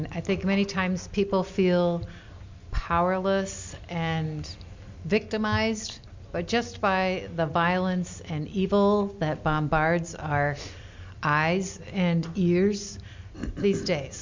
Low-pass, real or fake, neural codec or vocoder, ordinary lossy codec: 7.2 kHz; real; none; MP3, 64 kbps